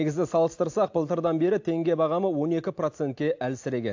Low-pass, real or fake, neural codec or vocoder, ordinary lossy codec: 7.2 kHz; real; none; AAC, 48 kbps